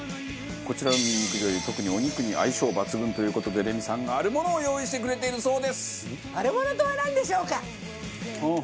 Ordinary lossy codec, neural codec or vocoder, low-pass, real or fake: none; none; none; real